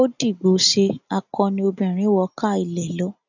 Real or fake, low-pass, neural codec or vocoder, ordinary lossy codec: real; 7.2 kHz; none; none